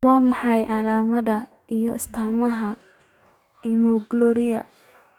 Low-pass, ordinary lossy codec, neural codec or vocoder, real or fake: 19.8 kHz; none; codec, 44.1 kHz, 2.6 kbps, DAC; fake